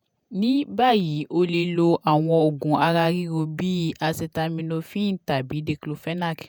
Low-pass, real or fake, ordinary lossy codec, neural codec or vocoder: none; fake; none; vocoder, 48 kHz, 128 mel bands, Vocos